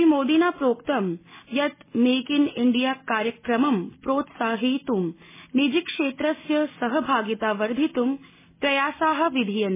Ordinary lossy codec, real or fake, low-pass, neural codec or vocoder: MP3, 16 kbps; real; 3.6 kHz; none